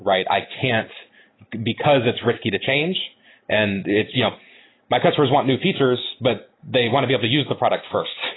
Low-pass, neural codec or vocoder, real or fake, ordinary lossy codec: 7.2 kHz; none; real; AAC, 16 kbps